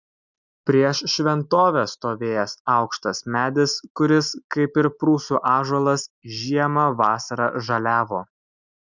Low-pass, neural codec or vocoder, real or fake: 7.2 kHz; none; real